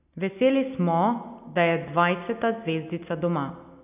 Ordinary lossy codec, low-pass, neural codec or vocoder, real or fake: none; 3.6 kHz; none; real